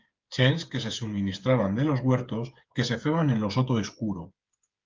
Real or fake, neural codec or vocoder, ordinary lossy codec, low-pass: fake; codec, 16 kHz, 8 kbps, FreqCodec, smaller model; Opus, 32 kbps; 7.2 kHz